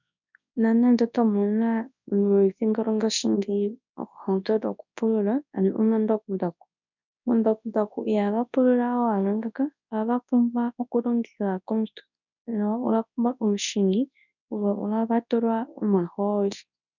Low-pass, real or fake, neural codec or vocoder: 7.2 kHz; fake; codec, 24 kHz, 0.9 kbps, WavTokenizer, large speech release